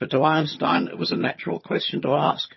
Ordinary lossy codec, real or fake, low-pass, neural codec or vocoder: MP3, 24 kbps; fake; 7.2 kHz; vocoder, 22.05 kHz, 80 mel bands, HiFi-GAN